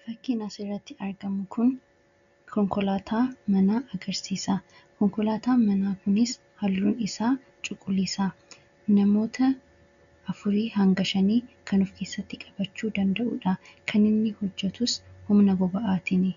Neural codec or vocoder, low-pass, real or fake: none; 7.2 kHz; real